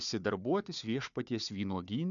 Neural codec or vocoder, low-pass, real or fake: codec, 16 kHz, 4 kbps, FunCodec, trained on Chinese and English, 50 frames a second; 7.2 kHz; fake